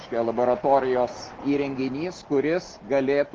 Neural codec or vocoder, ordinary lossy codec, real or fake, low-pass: none; Opus, 16 kbps; real; 7.2 kHz